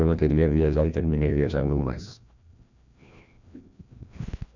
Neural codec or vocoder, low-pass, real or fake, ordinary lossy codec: codec, 16 kHz, 1 kbps, FreqCodec, larger model; 7.2 kHz; fake; none